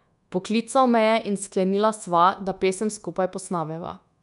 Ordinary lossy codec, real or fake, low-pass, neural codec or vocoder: none; fake; 10.8 kHz; codec, 24 kHz, 1.2 kbps, DualCodec